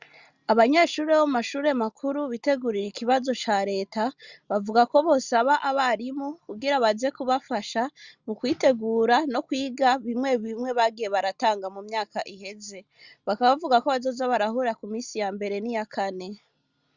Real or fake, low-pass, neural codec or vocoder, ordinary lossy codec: real; 7.2 kHz; none; Opus, 64 kbps